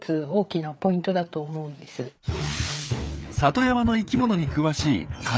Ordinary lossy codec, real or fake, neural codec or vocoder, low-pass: none; fake; codec, 16 kHz, 4 kbps, FreqCodec, larger model; none